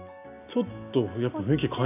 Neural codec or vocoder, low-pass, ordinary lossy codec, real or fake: none; 3.6 kHz; none; real